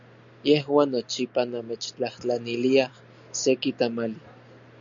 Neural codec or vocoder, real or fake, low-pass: none; real; 7.2 kHz